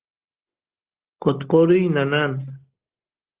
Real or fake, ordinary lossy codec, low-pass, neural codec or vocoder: real; Opus, 16 kbps; 3.6 kHz; none